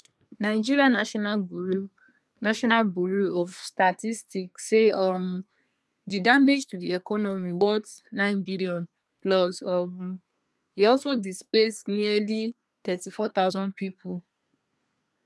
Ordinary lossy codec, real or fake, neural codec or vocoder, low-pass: none; fake; codec, 24 kHz, 1 kbps, SNAC; none